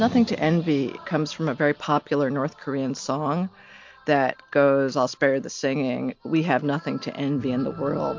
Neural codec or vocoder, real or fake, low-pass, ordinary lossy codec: none; real; 7.2 kHz; MP3, 48 kbps